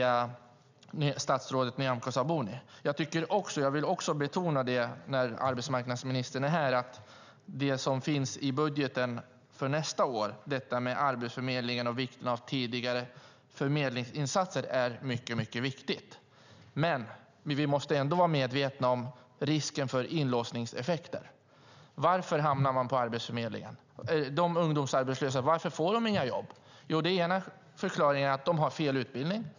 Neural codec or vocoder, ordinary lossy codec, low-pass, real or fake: none; none; 7.2 kHz; real